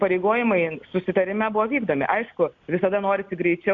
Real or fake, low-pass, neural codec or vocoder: real; 7.2 kHz; none